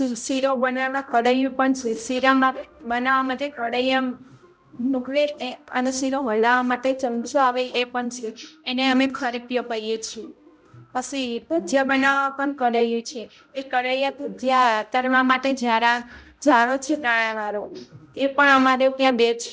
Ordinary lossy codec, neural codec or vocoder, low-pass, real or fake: none; codec, 16 kHz, 0.5 kbps, X-Codec, HuBERT features, trained on balanced general audio; none; fake